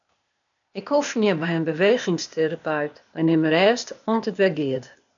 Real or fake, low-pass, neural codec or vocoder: fake; 7.2 kHz; codec, 16 kHz, 0.8 kbps, ZipCodec